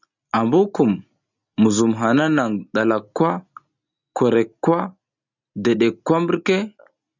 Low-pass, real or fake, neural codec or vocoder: 7.2 kHz; real; none